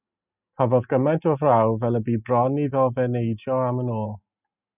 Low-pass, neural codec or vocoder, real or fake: 3.6 kHz; none; real